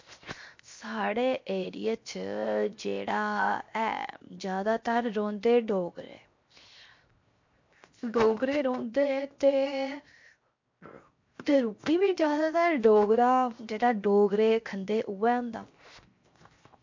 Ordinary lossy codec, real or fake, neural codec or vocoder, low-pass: MP3, 48 kbps; fake; codec, 16 kHz, 0.7 kbps, FocalCodec; 7.2 kHz